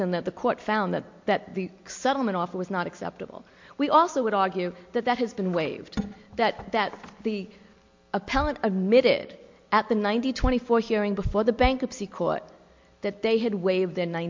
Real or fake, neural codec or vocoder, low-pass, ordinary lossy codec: real; none; 7.2 kHz; MP3, 48 kbps